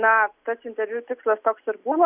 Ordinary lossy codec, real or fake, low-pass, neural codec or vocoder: Opus, 64 kbps; real; 3.6 kHz; none